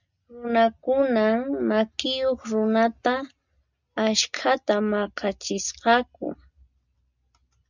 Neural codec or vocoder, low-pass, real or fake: none; 7.2 kHz; real